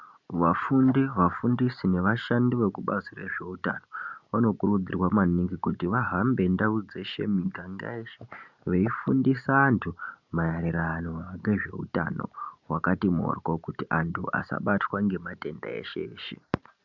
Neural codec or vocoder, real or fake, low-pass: none; real; 7.2 kHz